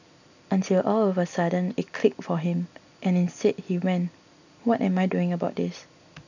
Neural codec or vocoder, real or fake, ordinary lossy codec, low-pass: none; real; none; 7.2 kHz